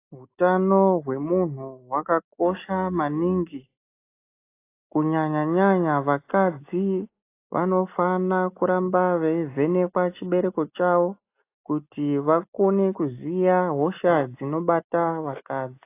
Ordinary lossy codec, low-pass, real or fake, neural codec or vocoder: AAC, 24 kbps; 3.6 kHz; real; none